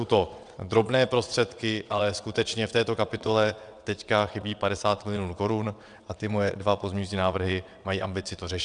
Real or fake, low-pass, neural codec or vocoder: fake; 9.9 kHz; vocoder, 22.05 kHz, 80 mel bands, WaveNeXt